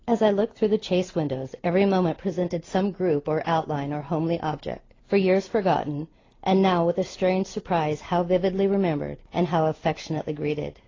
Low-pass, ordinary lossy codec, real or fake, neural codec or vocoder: 7.2 kHz; AAC, 32 kbps; real; none